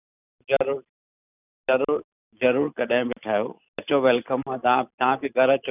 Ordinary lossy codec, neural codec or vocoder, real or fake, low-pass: none; none; real; 3.6 kHz